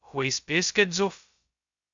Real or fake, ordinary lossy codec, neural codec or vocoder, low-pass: fake; Opus, 64 kbps; codec, 16 kHz, 0.2 kbps, FocalCodec; 7.2 kHz